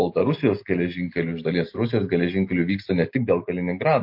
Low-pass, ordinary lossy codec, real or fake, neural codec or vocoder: 5.4 kHz; MP3, 32 kbps; real; none